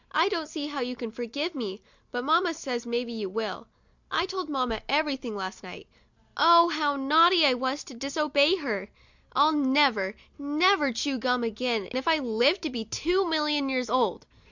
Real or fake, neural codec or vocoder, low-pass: real; none; 7.2 kHz